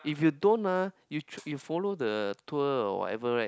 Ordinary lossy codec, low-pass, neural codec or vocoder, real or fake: none; none; none; real